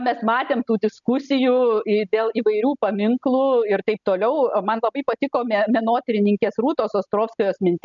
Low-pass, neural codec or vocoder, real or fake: 7.2 kHz; none; real